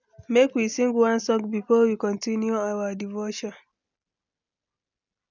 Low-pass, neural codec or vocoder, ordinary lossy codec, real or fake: 7.2 kHz; none; none; real